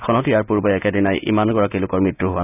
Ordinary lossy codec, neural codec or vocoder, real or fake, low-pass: none; none; real; 3.6 kHz